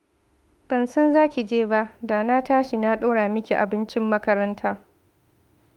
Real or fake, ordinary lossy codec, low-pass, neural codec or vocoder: fake; Opus, 32 kbps; 19.8 kHz; autoencoder, 48 kHz, 32 numbers a frame, DAC-VAE, trained on Japanese speech